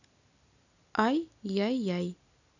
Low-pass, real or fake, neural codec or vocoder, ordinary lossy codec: 7.2 kHz; real; none; none